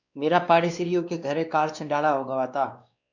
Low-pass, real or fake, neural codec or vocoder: 7.2 kHz; fake; codec, 16 kHz, 2 kbps, X-Codec, WavLM features, trained on Multilingual LibriSpeech